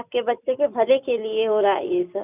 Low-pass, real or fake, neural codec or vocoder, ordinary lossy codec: 3.6 kHz; real; none; none